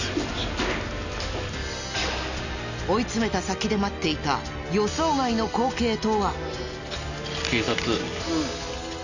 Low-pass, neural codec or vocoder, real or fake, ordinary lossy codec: 7.2 kHz; none; real; none